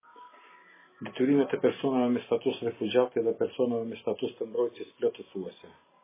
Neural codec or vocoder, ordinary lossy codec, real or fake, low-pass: none; MP3, 16 kbps; real; 3.6 kHz